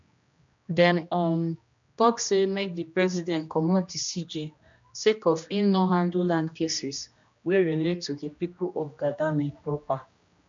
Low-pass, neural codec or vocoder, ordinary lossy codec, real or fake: 7.2 kHz; codec, 16 kHz, 1 kbps, X-Codec, HuBERT features, trained on general audio; MP3, 64 kbps; fake